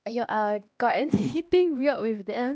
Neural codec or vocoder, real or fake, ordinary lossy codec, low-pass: codec, 16 kHz, 1 kbps, X-Codec, HuBERT features, trained on LibriSpeech; fake; none; none